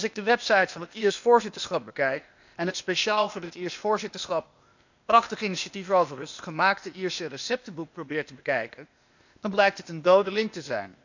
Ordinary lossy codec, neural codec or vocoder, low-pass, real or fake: none; codec, 16 kHz, 0.8 kbps, ZipCodec; 7.2 kHz; fake